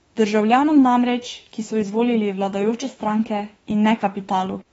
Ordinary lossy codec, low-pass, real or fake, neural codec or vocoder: AAC, 24 kbps; 19.8 kHz; fake; autoencoder, 48 kHz, 32 numbers a frame, DAC-VAE, trained on Japanese speech